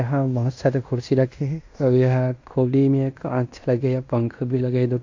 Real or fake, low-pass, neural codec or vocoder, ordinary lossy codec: fake; 7.2 kHz; codec, 16 kHz in and 24 kHz out, 0.9 kbps, LongCat-Audio-Codec, fine tuned four codebook decoder; AAC, 48 kbps